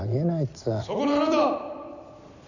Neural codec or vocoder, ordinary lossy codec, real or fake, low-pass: none; none; real; 7.2 kHz